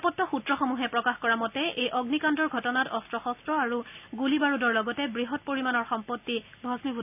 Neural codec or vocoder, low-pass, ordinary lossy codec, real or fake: none; 3.6 kHz; none; real